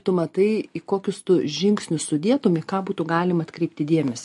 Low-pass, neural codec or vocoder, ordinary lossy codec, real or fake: 14.4 kHz; none; MP3, 48 kbps; real